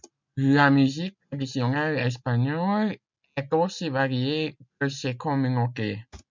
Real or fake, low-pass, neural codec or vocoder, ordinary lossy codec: real; 7.2 kHz; none; MP3, 64 kbps